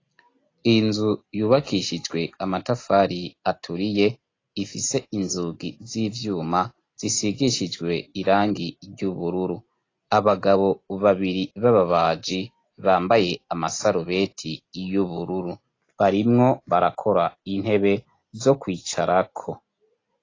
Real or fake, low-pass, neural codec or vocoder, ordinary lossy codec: real; 7.2 kHz; none; AAC, 32 kbps